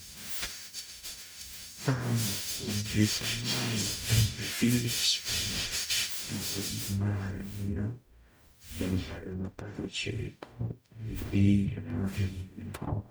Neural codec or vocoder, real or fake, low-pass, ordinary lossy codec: codec, 44.1 kHz, 0.9 kbps, DAC; fake; none; none